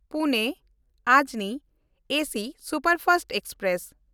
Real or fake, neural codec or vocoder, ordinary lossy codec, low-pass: real; none; none; none